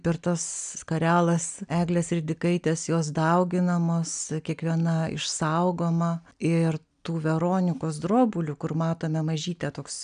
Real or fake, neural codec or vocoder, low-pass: real; none; 9.9 kHz